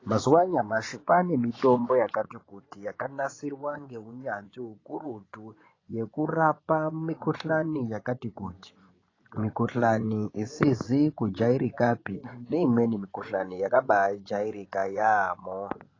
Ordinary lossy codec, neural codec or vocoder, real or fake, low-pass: AAC, 32 kbps; vocoder, 24 kHz, 100 mel bands, Vocos; fake; 7.2 kHz